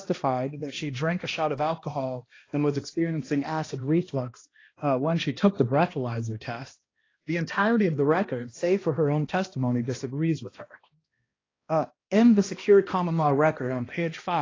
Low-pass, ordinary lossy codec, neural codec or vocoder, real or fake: 7.2 kHz; AAC, 32 kbps; codec, 16 kHz, 1 kbps, X-Codec, HuBERT features, trained on balanced general audio; fake